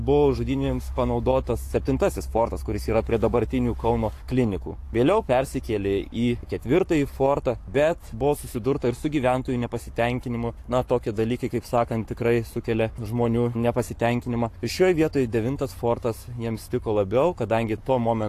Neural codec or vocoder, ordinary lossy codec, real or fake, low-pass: codec, 44.1 kHz, 7.8 kbps, Pupu-Codec; AAC, 64 kbps; fake; 14.4 kHz